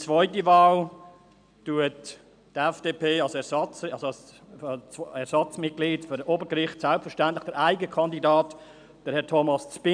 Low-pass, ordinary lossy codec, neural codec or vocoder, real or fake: 9.9 kHz; MP3, 96 kbps; none; real